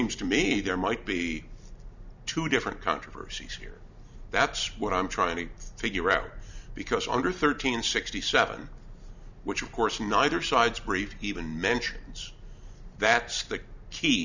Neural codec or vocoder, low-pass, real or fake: none; 7.2 kHz; real